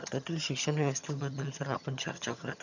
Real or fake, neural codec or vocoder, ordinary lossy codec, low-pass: fake; vocoder, 22.05 kHz, 80 mel bands, HiFi-GAN; none; 7.2 kHz